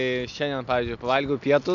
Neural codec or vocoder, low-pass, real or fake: none; 7.2 kHz; real